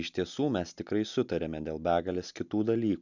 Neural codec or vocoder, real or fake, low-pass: none; real; 7.2 kHz